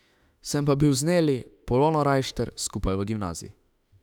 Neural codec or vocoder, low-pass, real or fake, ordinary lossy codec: autoencoder, 48 kHz, 32 numbers a frame, DAC-VAE, trained on Japanese speech; 19.8 kHz; fake; none